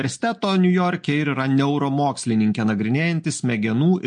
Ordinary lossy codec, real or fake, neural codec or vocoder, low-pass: MP3, 48 kbps; real; none; 9.9 kHz